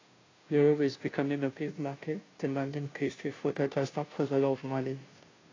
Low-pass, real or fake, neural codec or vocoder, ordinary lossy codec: 7.2 kHz; fake; codec, 16 kHz, 0.5 kbps, FunCodec, trained on Chinese and English, 25 frames a second; AAC, 32 kbps